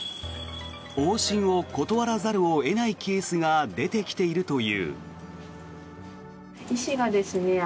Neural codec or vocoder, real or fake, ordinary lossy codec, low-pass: none; real; none; none